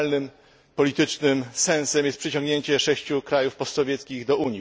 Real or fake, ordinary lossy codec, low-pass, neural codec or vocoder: real; none; none; none